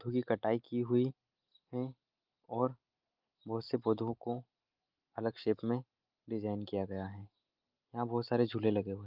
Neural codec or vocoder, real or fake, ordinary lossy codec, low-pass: none; real; Opus, 32 kbps; 5.4 kHz